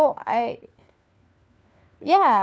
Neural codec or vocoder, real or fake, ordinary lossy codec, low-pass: codec, 16 kHz, 4 kbps, FunCodec, trained on LibriTTS, 50 frames a second; fake; none; none